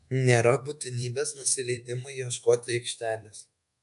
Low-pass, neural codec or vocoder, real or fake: 10.8 kHz; codec, 24 kHz, 1.2 kbps, DualCodec; fake